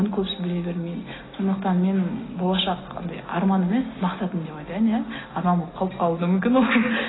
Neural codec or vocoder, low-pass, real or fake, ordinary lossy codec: none; 7.2 kHz; real; AAC, 16 kbps